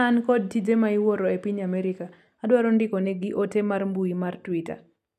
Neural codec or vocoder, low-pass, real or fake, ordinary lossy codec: none; 14.4 kHz; real; none